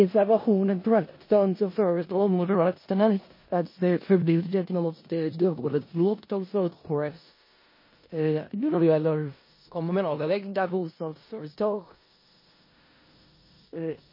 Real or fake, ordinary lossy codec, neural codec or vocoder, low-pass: fake; MP3, 24 kbps; codec, 16 kHz in and 24 kHz out, 0.4 kbps, LongCat-Audio-Codec, four codebook decoder; 5.4 kHz